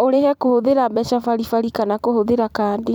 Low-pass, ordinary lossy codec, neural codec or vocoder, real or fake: 19.8 kHz; none; vocoder, 44.1 kHz, 128 mel bands every 256 samples, BigVGAN v2; fake